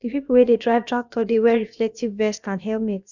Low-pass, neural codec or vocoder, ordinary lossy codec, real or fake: 7.2 kHz; codec, 16 kHz, about 1 kbps, DyCAST, with the encoder's durations; none; fake